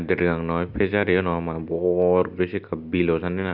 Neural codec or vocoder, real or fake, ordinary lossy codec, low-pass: none; real; Opus, 64 kbps; 5.4 kHz